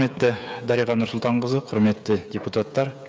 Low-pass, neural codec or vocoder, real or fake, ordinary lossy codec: none; codec, 16 kHz, 16 kbps, FreqCodec, smaller model; fake; none